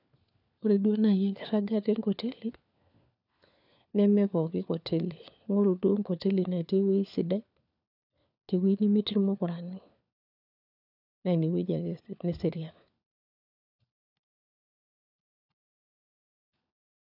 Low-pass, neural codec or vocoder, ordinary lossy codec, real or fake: 5.4 kHz; codec, 16 kHz, 4 kbps, FunCodec, trained on LibriTTS, 50 frames a second; none; fake